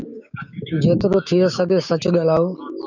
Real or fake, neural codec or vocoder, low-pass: fake; codec, 16 kHz, 6 kbps, DAC; 7.2 kHz